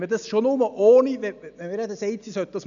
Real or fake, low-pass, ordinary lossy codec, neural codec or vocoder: real; 7.2 kHz; none; none